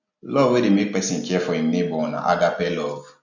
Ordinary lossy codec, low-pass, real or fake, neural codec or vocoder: none; 7.2 kHz; real; none